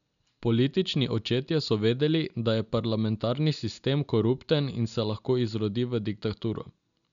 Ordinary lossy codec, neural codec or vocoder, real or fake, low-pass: none; none; real; 7.2 kHz